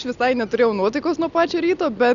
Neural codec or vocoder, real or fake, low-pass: none; real; 7.2 kHz